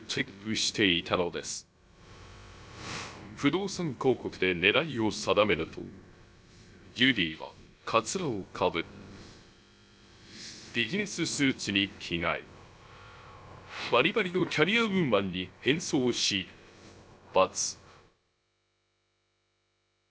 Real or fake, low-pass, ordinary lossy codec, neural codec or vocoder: fake; none; none; codec, 16 kHz, about 1 kbps, DyCAST, with the encoder's durations